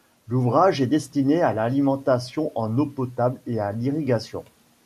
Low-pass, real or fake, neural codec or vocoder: 14.4 kHz; real; none